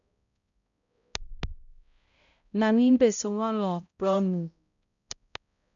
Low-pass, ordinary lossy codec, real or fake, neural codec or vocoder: 7.2 kHz; none; fake; codec, 16 kHz, 0.5 kbps, X-Codec, HuBERT features, trained on balanced general audio